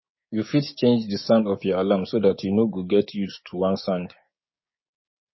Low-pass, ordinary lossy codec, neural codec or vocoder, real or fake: 7.2 kHz; MP3, 24 kbps; codec, 16 kHz, 6 kbps, DAC; fake